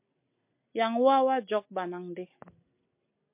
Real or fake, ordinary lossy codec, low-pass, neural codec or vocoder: real; MP3, 32 kbps; 3.6 kHz; none